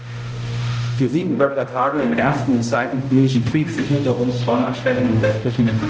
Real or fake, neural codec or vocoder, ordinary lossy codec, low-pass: fake; codec, 16 kHz, 0.5 kbps, X-Codec, HuBERT features, trained on balanced general audio; none; none